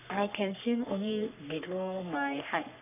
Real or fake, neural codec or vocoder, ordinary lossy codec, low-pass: fake; codec, 44.1 kHz, 3.4 kbps, Pupu-Codec; none; 3.6 kHz